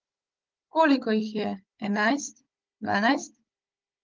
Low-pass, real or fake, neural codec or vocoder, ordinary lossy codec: 7.2 kHz; fake; codec, 16 kHz, 16 kbps, FunCodec, trained on Chinese and English, 50 frames a second; Opus, 24 kbps